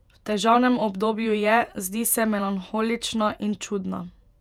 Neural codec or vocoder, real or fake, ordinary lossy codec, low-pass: vocoder, 48 kHz, 128 mel bands, Vocos; fake; none; 19.8 kHz